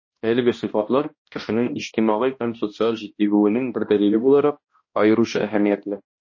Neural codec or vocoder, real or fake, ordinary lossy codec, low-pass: codec, 16 kHz, 1 kbps, X-Codec, HuBERT features, trained on balanced general audio; fake; MP3, 32 kbps; 7.2 kHz